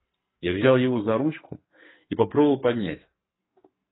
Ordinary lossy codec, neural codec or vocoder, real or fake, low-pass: AAC, 16 kbps; codec, 44.1 kHz, 2.6 kbps, SNAC; fake; 7.2 kHz